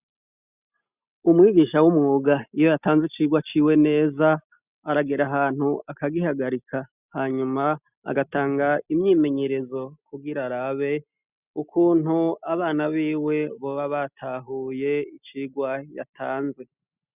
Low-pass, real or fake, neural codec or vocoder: 3.6 kHz; real; none